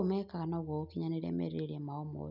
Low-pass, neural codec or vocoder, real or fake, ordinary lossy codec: 5.4 kHz; none; real; none